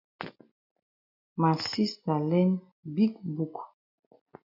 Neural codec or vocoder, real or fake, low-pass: none; real; 5.4 kHz